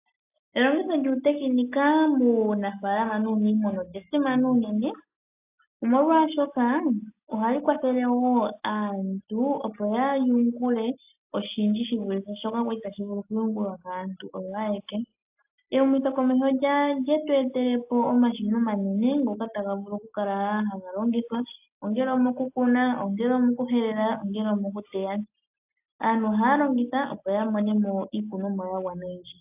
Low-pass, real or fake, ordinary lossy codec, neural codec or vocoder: 3.6 kHz; real; AAC, 32 kbps; none